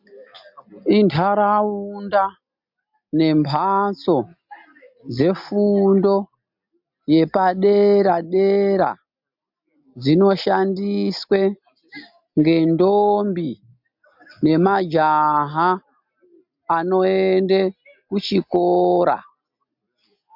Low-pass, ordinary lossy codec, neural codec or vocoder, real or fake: 5.4 kHz; MP3, 48 kbps; none; real